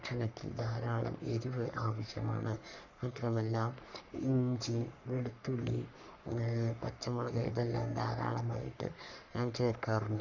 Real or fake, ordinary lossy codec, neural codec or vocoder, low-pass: fake; none; codec, 44.1 kHz, 3.4 kbps, Pupu-Codec; 7.2 kHz